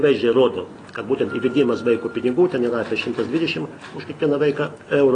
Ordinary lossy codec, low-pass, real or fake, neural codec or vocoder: AAC, 32 kbps; 9.9 kHz; real; none